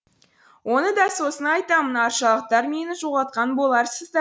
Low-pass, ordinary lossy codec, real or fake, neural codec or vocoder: none; none; real; none